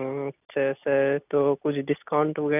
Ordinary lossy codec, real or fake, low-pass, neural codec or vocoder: none; real; 3.6 kHz; none